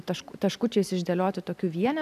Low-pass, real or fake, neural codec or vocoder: 14.4 kHz; real; none